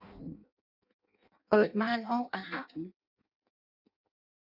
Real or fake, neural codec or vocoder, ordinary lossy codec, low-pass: fake; codec, 16 kHz in and 24 kHz out, 0.6 kbps, FireRedTTS-2 codec; none; 5.4 kHz